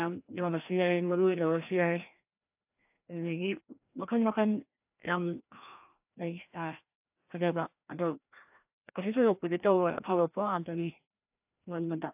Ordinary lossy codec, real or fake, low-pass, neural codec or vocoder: AAC, 32 kbps; fake; 3.6 kHz; codec, 16 kHz, 1 kbps, FreqCodec, larger model